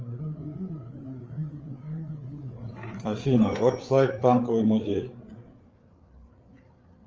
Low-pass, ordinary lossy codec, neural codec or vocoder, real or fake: 7.2 kHz; Opus, 24 kbps; codec, 16 kHz, 8 kbps, FreqCodec, larger model; fake